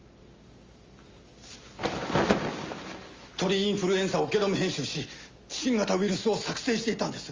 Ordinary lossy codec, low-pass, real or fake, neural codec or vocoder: Opus, 32 kbps; 7.2 kHz; real; none